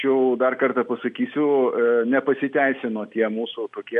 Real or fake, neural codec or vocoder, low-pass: real; none; 10.8 kHz